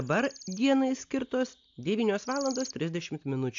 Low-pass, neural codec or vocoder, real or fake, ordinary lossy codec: 7.2 kHz; none; real; AAC, 64 kbps